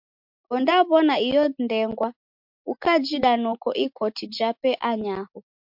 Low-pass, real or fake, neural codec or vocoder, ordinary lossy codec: 5.4 kHz; real; none; MP3, 48 kbps